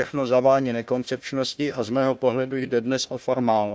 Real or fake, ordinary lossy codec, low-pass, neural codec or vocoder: fake; none; none; codec, 16 kHz, 1 kbps, FunCodec, trained on Chinese and English, 50 frames a second